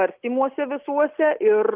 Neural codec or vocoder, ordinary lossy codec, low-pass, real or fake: none; Opus, 24 kbps; 3.6 kHz; real